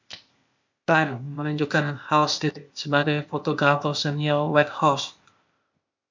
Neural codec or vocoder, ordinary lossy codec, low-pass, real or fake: codec, 16 kHz, 0.8 kbps, ZipCodec; MP3, 64 kbps; 7.2 kHz; fake